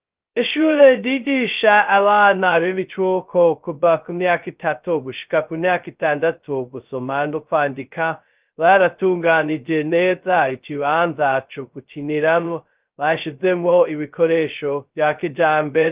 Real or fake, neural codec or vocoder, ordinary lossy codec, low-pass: fake; codec, 16 kHz, 0.2 kbps, FocalCodec; Opus, 32 kbps; 3.6 kHz